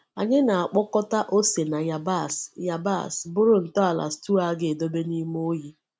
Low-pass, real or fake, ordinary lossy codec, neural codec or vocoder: none; real; none; none